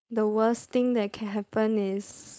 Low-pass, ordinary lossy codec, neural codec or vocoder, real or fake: none; none; codec, 16 kHz, 4.8 kbps, FACodec; fake